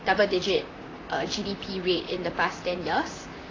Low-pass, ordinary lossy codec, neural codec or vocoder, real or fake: 7.2 kHz; AAC, 32 kbps; vocoder, 44.1 kHz, 128 mel bands, Pupu-Vocoder; fake